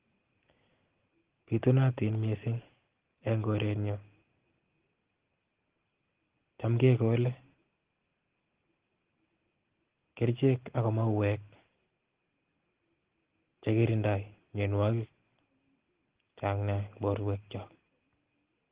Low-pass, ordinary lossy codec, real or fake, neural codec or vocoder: 3.6 kHz; Opus, 16 kbps; real; none